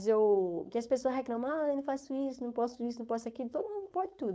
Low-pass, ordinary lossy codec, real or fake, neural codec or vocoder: none; none; fake; codec, 16 kHz, 4.8 kbps, FACodec